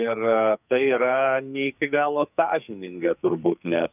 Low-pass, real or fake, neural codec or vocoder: 3.6 kHz; fake; codec, 44.1 kHz, 2.6 kbps, SNAC